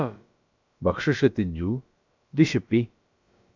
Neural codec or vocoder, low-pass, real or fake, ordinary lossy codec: codec, 16 kHz, about 1 kbps, DyCAST, with the encoder's durations; 7.2 kHz; fake; MP3, 64 kbps